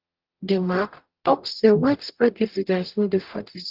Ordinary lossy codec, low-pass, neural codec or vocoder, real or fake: Opus, 32 kbps; 5.4 kHz; codec, 44.1 kHz, 0.9 kbps, DAC; fake